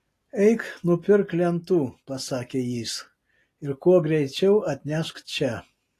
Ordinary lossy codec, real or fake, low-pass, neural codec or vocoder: AAC, 48 kbps; real; 14.4 kHz; none